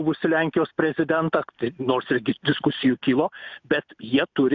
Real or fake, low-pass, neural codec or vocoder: real; 7.2 kHz; none